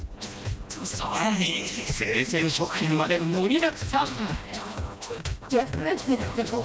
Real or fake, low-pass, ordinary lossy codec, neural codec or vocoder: fake; none; none; codec, 16 kHz, 1 kbps, FreqCodec, smaller model